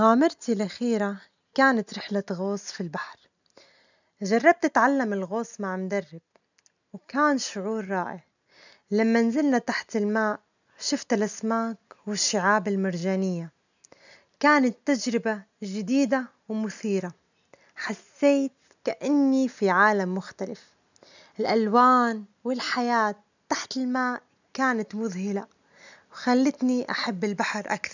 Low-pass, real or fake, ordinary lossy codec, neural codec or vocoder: 7.2 kHz; real; none; none